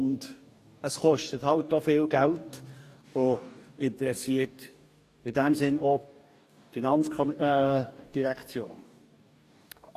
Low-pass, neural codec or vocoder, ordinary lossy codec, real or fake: 14.4 kHz; codec, 44.1 kHz, 2.6 kbps, DAC; AAC, 64 kbps; fake